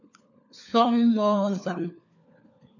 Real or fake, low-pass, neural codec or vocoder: fake; 7.2 kHz; codec, 16 kHz, 4 kbps, FunCodec, trained on LibriTTS, 50 frames a second